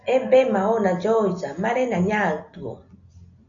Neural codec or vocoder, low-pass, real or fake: none; 7.2 kHz; real